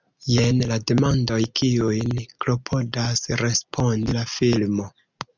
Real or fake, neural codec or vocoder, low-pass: real; none; 7.2 kHz